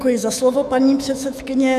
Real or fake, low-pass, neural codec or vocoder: fake; 14.4 kHz; autoencoder, 48 kHz, 128 numbers a frame, DAC-VAE, trained on Japanese speech